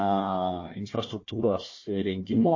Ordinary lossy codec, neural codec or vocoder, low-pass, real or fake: MP3, 32 kbps; codec, 16 kHz, 1 kbps, FunCodec, trained on Chinese and English, 50 frames a second; 7.2 kHz; fake